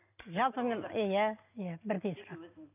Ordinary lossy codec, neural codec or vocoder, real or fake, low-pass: none; none; real; 3.6 kHz